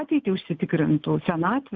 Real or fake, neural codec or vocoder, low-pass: real; none; 7.2 kHz